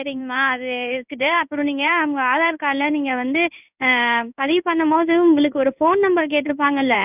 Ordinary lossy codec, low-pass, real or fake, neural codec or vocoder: none; 3.6 kHz; fake; codec, 16 kHz in and 24 kHz out, 1 kbps, XY-Tokenizer